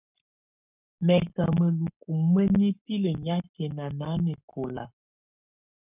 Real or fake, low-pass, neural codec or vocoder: fake; 3.6 kHz; codec, 44.1 kHz, 7.8 kbps, Pupu-Codec